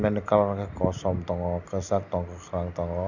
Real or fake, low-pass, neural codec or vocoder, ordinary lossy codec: real; 7.2 kHz; none; none